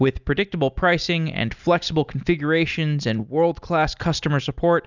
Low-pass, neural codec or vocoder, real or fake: 7.2 kHz; none; real